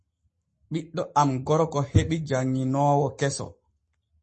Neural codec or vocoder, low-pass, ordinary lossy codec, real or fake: codec, 44.1 kHz, 7.8 kbps, Pupu-Codec; 10.8 kHz; MP3, 32 kbps; fake